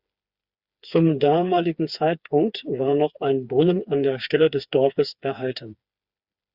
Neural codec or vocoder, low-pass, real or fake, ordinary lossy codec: codec, 16 kHz, 4 kbps, FreqCodec, smaller model; 5.4 kHz; fake; Opus, 64 kbps